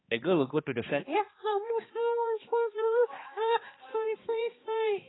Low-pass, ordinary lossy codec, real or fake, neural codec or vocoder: 7.2 kHz; AAC, 16 kbps; fake; codec, 16 kHz, 2 kbps, X-Codec, HuBERT features, trained on balanced general audio